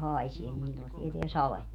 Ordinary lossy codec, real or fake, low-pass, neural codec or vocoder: none; fake; 19.8 kHz; autoencoder, 48 kHz, 128 numbers a frame, DAC-VAE, trained on Japanese speech